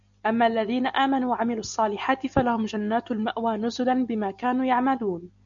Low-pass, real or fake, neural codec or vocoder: 7.2 kHz; real; none